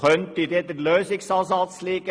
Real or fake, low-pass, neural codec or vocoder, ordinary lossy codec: real; none; none; none